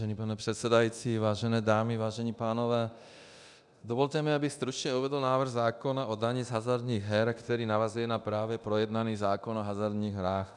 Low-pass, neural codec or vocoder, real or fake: 10.8 kHz; codec, 24 kHz, 0.9 kbps, DualCodec; fake